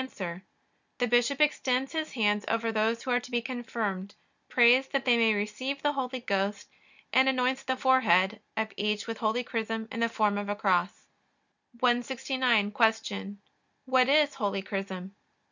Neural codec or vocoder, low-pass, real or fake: none; 7.2 kHz; real